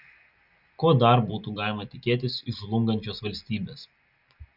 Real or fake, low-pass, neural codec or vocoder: real; 5.4 kHz; none